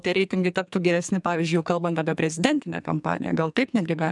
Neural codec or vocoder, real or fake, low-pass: codec, 44.1 kHz, 2.6 kbps, SNAC; fake; 10.8 kHz